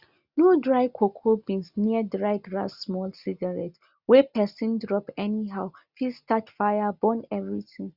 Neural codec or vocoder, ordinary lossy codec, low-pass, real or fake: none; Opus, 64 kbps; 5.4 kHz; real